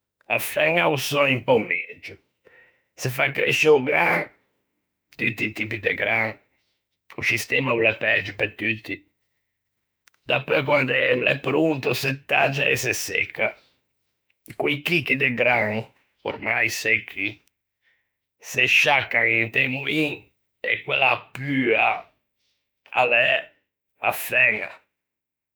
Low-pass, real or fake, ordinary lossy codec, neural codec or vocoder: none; fake; none; autoencoder, 48 kHz, 32 numbers a frame, DAC-VAE, trained on Japanese speech